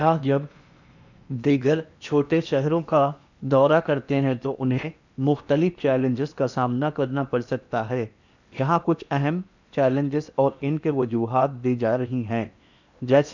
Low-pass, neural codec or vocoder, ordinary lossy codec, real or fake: 7.2 kHz; codec, 16 kHz in and 24 kHz out, 0.8 kbps, FocalCodec, streaming, 65536 codes; none; fake